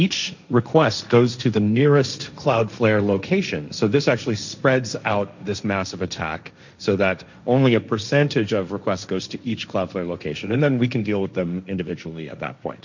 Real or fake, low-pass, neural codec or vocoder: fake; 7.2 kHz; codec, 16 kHz, 1.1 kbps, Voila-Tokenizer